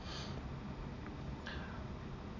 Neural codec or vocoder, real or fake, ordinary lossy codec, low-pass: none; real; none; 7.2 kHz